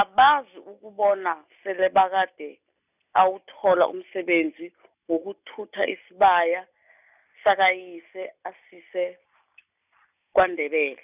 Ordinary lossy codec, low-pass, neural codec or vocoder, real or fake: none; 3.6 kHz; none; real